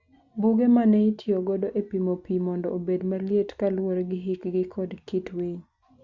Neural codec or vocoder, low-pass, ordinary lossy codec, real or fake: none; 7.2 kHz; Opus, 64 kbps; real